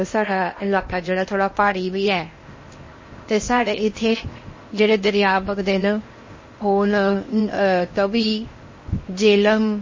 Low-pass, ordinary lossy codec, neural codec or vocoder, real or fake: 7.2 kHz; MP3, 32 kbps; codec, 16 kHz in and 24 kHz out, 0.8 kbps, FocalCodec, streaming, 65536 codes; fake